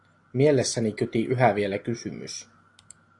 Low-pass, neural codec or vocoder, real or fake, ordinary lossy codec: 10.8 kHz; none; real; AAC, 48 kbps